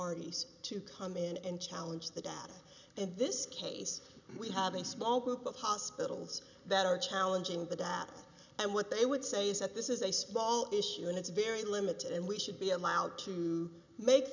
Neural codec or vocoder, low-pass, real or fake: none; 7.2 kHz; real